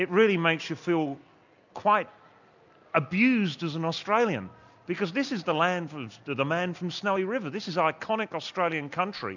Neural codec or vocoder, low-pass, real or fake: none; 7.2 kHz; real